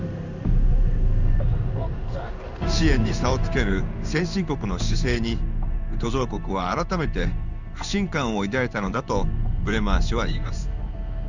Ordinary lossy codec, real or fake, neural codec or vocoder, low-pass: none; fake; codec, 16 kHz in and 24 kHz out, 1 kbps, XY-Tokenizer; 7.2 kHz